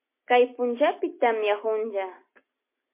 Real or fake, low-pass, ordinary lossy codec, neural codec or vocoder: real; 3.6 kHz; MP3, 24 kbps; none